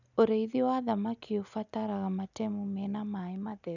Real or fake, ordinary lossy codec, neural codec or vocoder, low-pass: real; none; none; 7.2 kHz